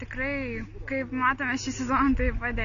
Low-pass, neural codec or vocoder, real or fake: 7.2 kHz; none; real